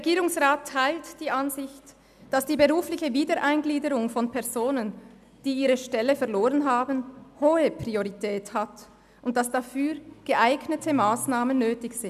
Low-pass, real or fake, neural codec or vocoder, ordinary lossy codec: 14.4 kHz; real; none; none